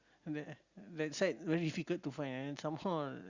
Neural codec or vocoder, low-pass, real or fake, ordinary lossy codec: none; 7.2 kHz; real; Opus, 64 kbps